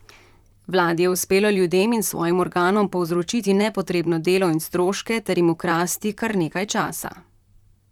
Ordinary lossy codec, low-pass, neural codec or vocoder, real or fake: none; 19.8 kHz; vocoder, 44.1 kHz, 128 mel bands, Pupu-Vocoder; fake